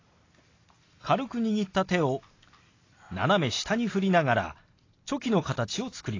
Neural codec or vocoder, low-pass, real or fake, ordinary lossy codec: none; 7.2 kHz; real; AAC, 32 kbps